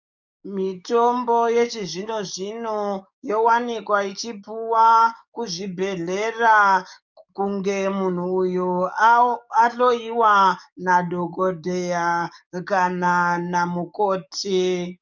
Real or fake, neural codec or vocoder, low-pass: fake; codec, 44.1 kHz, 7.8 kbps, DAC; 7.2 kHz